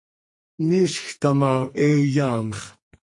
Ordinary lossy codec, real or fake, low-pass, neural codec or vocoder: MP3, 48 kbps; fake; 10.8 kHz; codec, 44.1 kHz, 2.6 kbps, SNAC